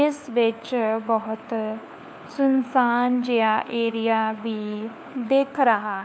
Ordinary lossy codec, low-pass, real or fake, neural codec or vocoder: none; none; fake; codec, 16 kHz, 4 kbps, FunCodec, trained on LibriTTS, 50 frames a second